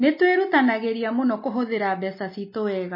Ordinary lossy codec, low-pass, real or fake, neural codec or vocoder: MP3, 24 kbps; 5.4 kHz; real; none